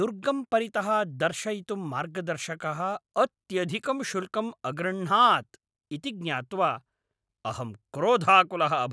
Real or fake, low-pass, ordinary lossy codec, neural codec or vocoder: real; none; none; none